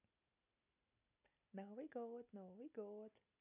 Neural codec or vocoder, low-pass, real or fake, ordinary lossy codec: none; 3.6 kHz; real; none